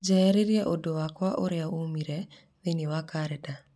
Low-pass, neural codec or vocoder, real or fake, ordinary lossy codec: none; none; real; none